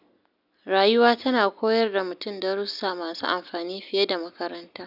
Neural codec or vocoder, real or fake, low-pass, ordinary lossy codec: none; real; 5.4 kHz; none